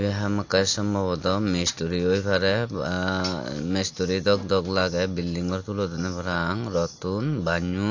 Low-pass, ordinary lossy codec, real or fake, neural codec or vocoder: 7.2 kHz; AAC, 48 kbps; real; none